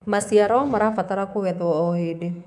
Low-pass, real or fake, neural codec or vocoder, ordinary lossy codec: none; fake; codec, 24 kHz, 3.1 kbps, DualCodec; none